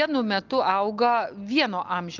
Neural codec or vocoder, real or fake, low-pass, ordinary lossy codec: none; real; 7.2 kHz; Opus, 32 kbps